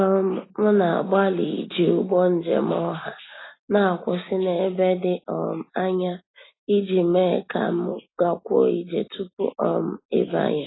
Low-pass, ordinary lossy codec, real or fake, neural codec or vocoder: 7.2 kHz; AAC, 16 kbps; real; none